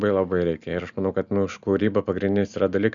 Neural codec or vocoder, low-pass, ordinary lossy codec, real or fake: none; 7.2 kHz; Opus, 64 kbps; real